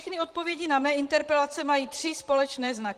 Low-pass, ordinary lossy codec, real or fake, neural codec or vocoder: 14.4 kHz; Opus, 24 kbps; fake; vocoder, 44.1 kHz, 128 mel bands, Pupu-Vocoder